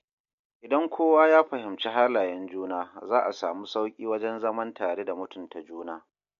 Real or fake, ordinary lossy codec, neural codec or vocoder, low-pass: real; MP3, 48 kbps; none; 7.2 kHz